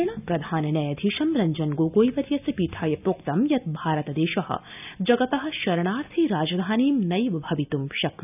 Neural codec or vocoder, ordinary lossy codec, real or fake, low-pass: none; none; real; 3.6 kHz